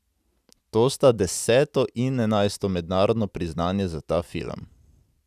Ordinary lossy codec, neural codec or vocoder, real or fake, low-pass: none; none; real; 14.4 kHz